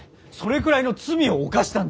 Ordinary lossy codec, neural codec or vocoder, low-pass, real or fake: none; none; none; real